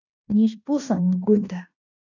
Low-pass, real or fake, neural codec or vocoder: 7.2 kHz; fake; codec, 16 kHz in and 24 kHz out, 0.9 kbps, LongCat-Audio-Codec, fine tuned four codebook decoder